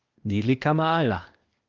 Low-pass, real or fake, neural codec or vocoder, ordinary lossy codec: 7.2 kHz; fake; codec, 16 kHz, 0.8 kbps, ZipCodec; Opus, 32 kbps